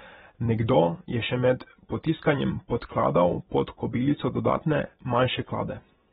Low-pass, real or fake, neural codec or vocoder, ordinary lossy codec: 19.8 kHz; fake; vocoder, 44.1 kHz, 128 mel bands every 256 samples, BigVGAN v2; AAC, 16 kbps